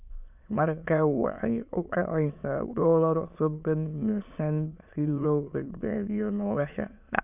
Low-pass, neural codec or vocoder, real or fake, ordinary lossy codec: 3.6 kHz; autoencoder, 22.05 kHz, a latent of 192 numbers a frame, VITS, trained on many speakers; fake; none